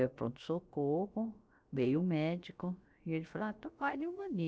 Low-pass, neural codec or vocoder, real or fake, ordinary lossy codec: none; codec, 16 kHz, about 1 kbps, DyCAST, with the encoder's durations; fake; none